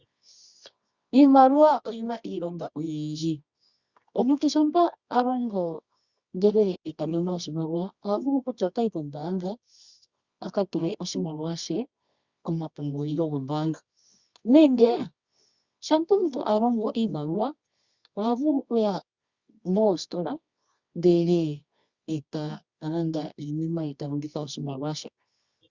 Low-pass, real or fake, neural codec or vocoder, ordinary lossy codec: 7.2 kHz; fake; codec, 24 kHz, 0.9 kbps, WavTokenizer, medium music audio release; Opus, 64 kbps